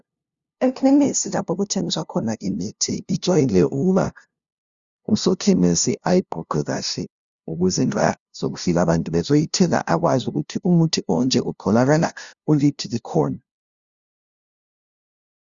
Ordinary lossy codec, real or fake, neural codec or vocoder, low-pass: Opus, 64 kbps; fake; codec, 16 kHz, 0.5 kbps, FunCodec, trained on LibriTTS, 25 frames a second; 7.2 kHz